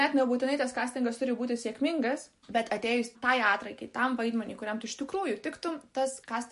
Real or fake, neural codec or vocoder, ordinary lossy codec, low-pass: real; none; MP3, 48 kbps; 14.4 kHz